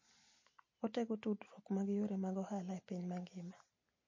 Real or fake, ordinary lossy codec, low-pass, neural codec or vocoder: real; MP3, 32 kbps; 7.2 kHz; none